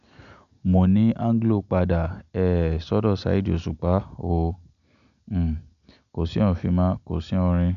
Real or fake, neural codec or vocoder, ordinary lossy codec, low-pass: real; none; none; 7.2 kHz